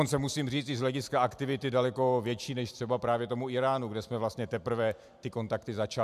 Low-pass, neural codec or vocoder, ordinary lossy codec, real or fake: 14.4 kHz; none; MP3, 96 kbps; real